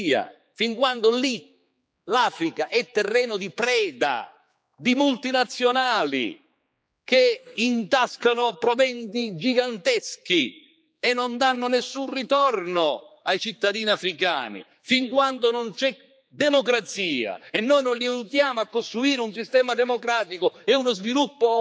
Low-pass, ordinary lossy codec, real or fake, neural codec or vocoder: none; none; fake; codec, 16 kHz, 4 kbps, X-Codec, HuBERT features, trained on general audio